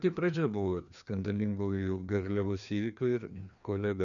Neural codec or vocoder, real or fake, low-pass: codec, 16 kHz, 2 kbps, FreqCodec, larger model; fake; 7.2 kHz